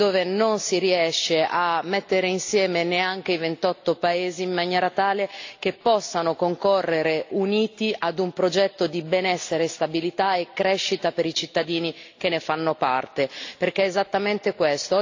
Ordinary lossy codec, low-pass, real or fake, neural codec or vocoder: AAC, 48 kbps; 7.2 kHz; real; none